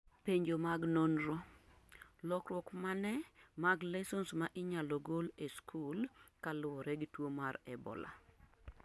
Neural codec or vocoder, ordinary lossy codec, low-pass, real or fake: none; none; none; real